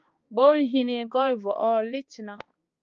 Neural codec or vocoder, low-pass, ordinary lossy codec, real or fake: codec, 16 kHz, 2 kbps, X-Codec, HuBERT features, trained on balanced general audio; 7.2 kHz; Opus, 24 kbps; fake